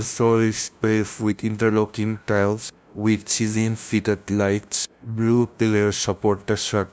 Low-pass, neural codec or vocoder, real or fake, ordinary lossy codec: none; codec, 16 kHz, 0.5 kbps, FunCodec, trained on LibriTTS, 25 frames a second; fake; none